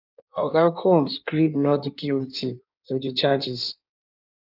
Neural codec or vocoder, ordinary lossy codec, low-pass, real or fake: codec, 16 kHz in and 24 kHz out, 1.1 kbps, FireRedTTS-2 codec; none; 5.4 kHz; fake